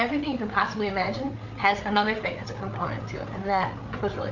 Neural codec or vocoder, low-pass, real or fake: codec, 16 kHz, 8 kbps, FreqCodec, larger model; 7.2 kHz; fake